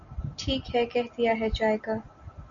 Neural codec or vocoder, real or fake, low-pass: none; real; 7.2 kHz